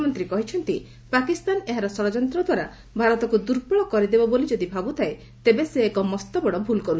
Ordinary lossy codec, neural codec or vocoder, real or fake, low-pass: none; none; real; none